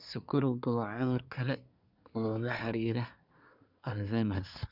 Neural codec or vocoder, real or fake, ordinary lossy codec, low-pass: codec, 24 kHz, 1 kbps, SNAC; fake; none; 5.4 kHz